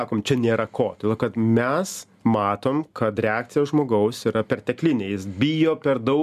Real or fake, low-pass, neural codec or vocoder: real; 14.4 kHz; none